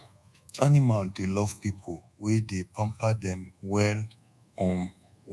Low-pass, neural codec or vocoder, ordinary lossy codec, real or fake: none; codec, 24 kHz, 1.2 kbps, DualCodec; none; fake